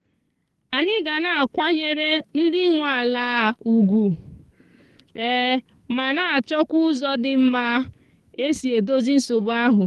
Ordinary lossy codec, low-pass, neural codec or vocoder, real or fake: Opus, 24 kbps; 14.4 kHz; codec, 44.1 kHz, 2.6 kbps, SNAC; fake